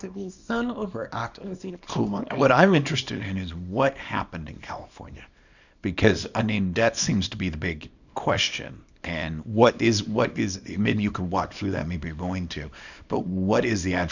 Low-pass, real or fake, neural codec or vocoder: 7.2 kHz; fake; codec, 24 kHz, 0.9 kbps, WavTokenizer, small release